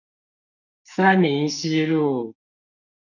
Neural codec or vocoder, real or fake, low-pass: codec, 44.1 kHz, 2.6 kbps, SNAC; fake; 7.2 kHz